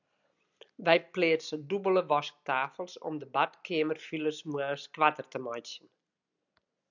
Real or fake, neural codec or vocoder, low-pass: real; none; 7.2 kHz